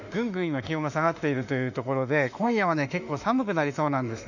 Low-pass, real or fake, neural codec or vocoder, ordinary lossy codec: 7.2 kHz; fake; autoencoder, 48 kHz, 32 numbers a frame, DAC-VAE, trained on Japanese speech; none